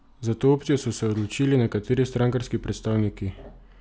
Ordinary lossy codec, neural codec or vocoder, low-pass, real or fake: none; none; none; real